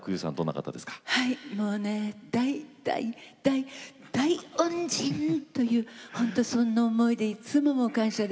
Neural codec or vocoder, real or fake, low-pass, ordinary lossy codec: none; real; none; none